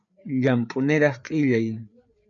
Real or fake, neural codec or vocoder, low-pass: fake; codec, 16 kHz, 4 kbps, FreqCodec, larger model; 7.2 kHz